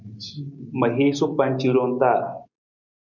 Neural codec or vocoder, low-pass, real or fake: none; 7.2 kHz; real